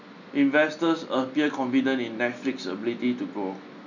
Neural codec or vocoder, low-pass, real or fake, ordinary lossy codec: none; 7.2 kHz; real; none